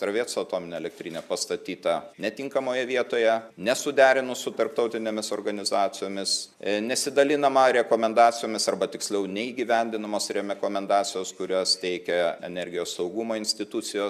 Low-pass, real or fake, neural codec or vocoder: 14.4 kHz; real; none